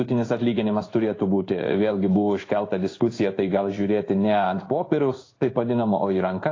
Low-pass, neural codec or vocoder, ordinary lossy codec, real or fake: 7.2 kHz; codec, 16 kHz in and 24 kHz out, 1 kbps, XY-Tokenizer; AAC, 32 kbps; fake